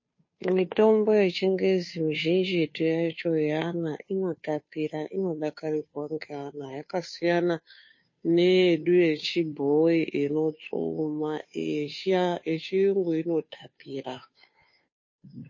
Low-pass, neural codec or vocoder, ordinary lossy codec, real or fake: 7.2 kHz; codec, 16 kHz, 2 kbps, FunCodec, trained on Chinese and English, 25 frames a second; MP3, 32 kbps; fake